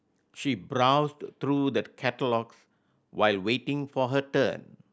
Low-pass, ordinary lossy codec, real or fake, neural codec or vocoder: none; none; real; none